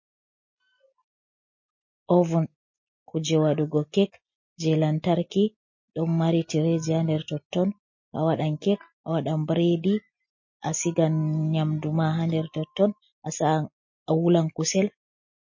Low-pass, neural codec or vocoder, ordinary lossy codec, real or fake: 7.2 kHz; none; MP3, 32 kbps; real